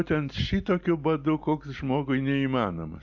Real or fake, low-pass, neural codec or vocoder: real; 7.2 kHz; none